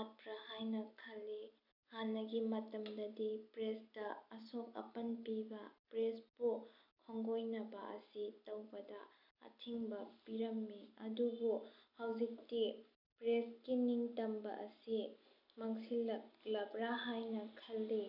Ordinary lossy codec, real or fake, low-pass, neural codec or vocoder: none; real; 5.4 kHz; none